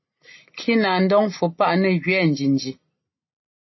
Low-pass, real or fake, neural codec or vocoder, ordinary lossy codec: 7.2 kHz; real; none; MP3, 24 kbps